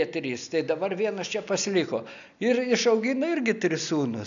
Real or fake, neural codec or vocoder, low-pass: real; none; 7.2 kHz